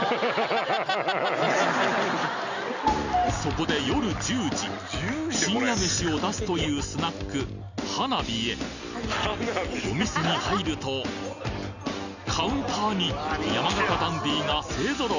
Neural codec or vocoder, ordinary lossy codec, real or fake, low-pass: none; none; real; 7.2 kHz